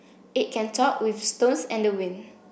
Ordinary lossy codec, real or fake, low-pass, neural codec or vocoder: none; real; none; none